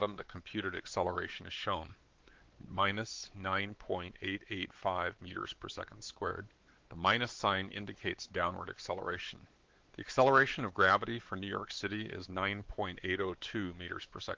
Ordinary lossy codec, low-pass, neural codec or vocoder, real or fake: Opus, 16 kbps; 7.2 kHz; codec, 16 kHz, 4 kbps, X-Codec, WavLM features, trained on Multilingual LibriSpeech; fake